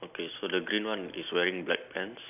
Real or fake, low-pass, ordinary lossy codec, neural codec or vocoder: real; 3.6 kHz; none; none